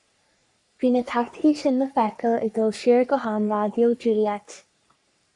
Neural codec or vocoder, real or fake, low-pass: codec, 44.1 kHz, 3.4 kbps, Pupu-Codec; fake; 10.8 kHz